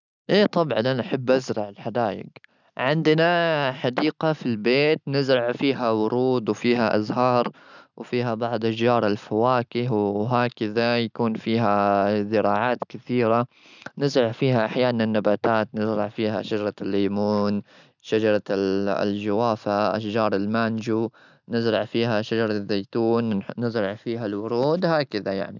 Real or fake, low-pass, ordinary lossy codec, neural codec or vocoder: real; 7.2 kHz; none; none